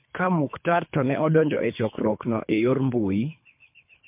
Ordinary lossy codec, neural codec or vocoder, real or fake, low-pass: MP3, 32 kbps; codec, 24 kHz, 3 kbps, HILCodec; fake; 3.6 kHz